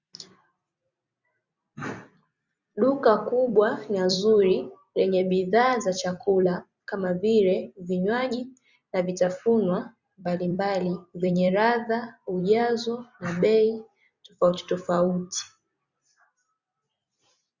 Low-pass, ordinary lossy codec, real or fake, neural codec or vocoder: 7.2 kHz; Opus, 64 kbps; fake; vocoder, 44.1 kHz, 128 mel bands every 256 samples, BigVGAN v2